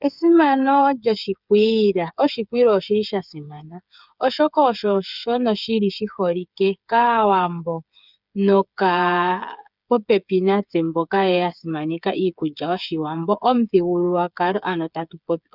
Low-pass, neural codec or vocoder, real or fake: 5.4 kHz; codec, 16 kHz, 8 kbps, FreqCodec, smaller model; fake